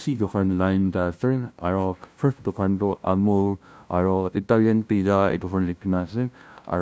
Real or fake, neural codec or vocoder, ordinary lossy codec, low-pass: fake; codec, 16 kHz, 0.5 kbps, FunCodec, trained on LibriTTS, 25 frames a second; none; none